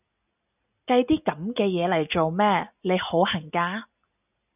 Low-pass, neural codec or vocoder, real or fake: 3.6 kHz; none; real